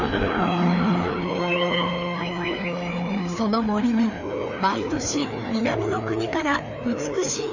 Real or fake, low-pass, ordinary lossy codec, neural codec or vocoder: fake; 7.2 kHz; none; codec, 16 kHz, 2 kbps, FreqCodec, larger model